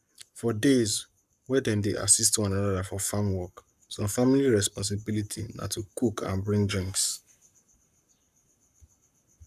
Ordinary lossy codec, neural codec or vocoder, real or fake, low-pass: none; codec, 44.1 kHz, 7.8 kbps, Pupu-Codec; fake; 14.4 kHz